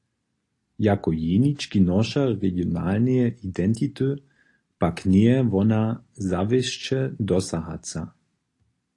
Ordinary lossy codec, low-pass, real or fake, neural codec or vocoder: AAC, 48 kbps; 10.8 kHz; real; none